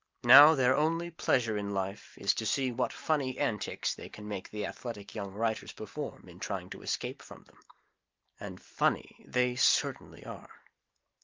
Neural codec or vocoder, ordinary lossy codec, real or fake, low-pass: none; Opus, 24 kbps; real; 7.2 kHz